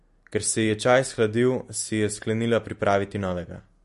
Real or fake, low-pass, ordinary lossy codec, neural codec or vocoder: real; 14.4 kHz; MP3, 48 kbps; none